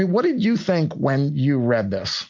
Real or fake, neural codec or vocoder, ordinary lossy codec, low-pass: real; none; MP3, 48 kbps; 7.2 kHz